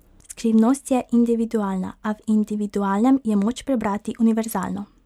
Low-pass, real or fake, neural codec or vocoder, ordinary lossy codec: 19.8 kHz; real; none; MP3, 96 kbps